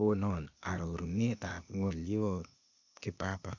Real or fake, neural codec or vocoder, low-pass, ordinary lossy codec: fake; codec, 16 kHz, 0.8 kbps, ZipCodec; 7.2 kHz; none